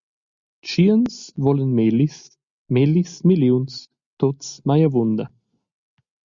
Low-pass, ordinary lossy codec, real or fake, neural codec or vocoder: 7.2 kHz; Opus, 64 kbps; real; none